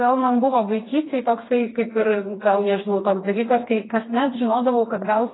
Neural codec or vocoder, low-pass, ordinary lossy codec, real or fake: codec, 16 kHz, 2 kbps, FreqCodec, smaller model; 7.2 kHz; AAC, 16 kbps; fake